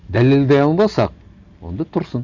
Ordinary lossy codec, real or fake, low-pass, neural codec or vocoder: none; real; 7.2 kHz; none